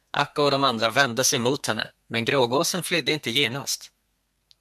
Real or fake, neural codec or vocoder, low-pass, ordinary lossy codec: fake; codec, 32 kHz, 1.9 kbps, SNAC; 14.4 kHz; MP3, 96 kbps